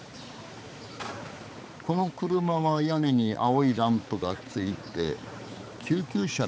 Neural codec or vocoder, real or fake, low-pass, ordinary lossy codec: codec, 16 kHz, 4 kbps, X-Codec, HuBERT features, trained on balanced general audio; fake; none; none